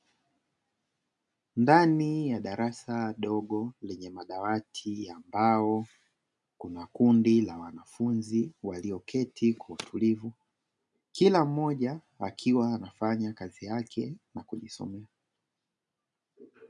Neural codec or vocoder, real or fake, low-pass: none; real; 10.8 kHz